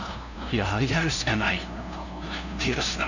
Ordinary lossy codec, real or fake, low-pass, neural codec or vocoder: none; fake; 7.2 kHz; codec, 16 kHz, 0.5 kbps, FunCodec, trained on LibriTTS, 25 frames a second